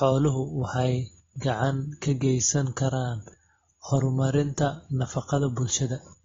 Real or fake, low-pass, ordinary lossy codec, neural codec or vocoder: real; 7.2 kHz; AAC, 24 kbps; none